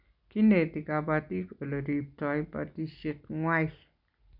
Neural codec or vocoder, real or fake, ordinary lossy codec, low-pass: none; real; none; 5.4 kHz